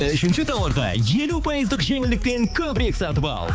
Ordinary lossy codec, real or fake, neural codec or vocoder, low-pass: none; fake; codec, 16 kHz, 4 kbps, X-Codec, HuBERT features, trained on balanced general audio; none